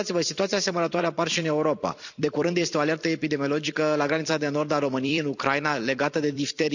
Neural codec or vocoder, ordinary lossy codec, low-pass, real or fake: vocoder, 44.1 kHz, 128 mel bands every 256 samples, BigVGAN v2; none; 7.2 kHz; fake